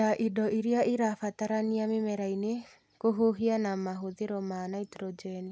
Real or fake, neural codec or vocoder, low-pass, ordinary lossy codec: real; none; none; none